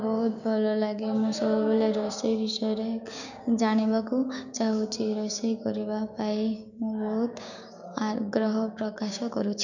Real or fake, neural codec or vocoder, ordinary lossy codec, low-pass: fake; codec, 16 kHz, 6 kbps, DAC; none; 7.2 kHz